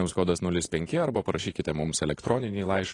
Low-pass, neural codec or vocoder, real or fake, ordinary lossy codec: 10.8 kHz; vocoder, 24 kHz, 100 mel bands, Vocos; fake; AAC, 32 kbps